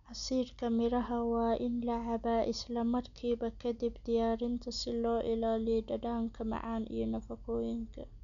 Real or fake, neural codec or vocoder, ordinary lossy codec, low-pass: real; none; MP3, 96 kbps; 7.2 kHz